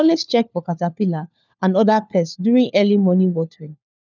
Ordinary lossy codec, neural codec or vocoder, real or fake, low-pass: none; codec, 16 kHz, 4 kbps, FunCodec, trained on LibriTTS, 50 frames a second; fake; 7.2 kHz